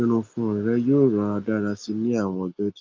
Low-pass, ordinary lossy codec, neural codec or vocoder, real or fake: 7.2 kHz; Opus, 24 kbps; none; real